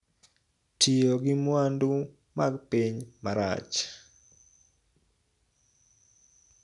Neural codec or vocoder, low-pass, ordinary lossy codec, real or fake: none; 10.8 kHz; none; real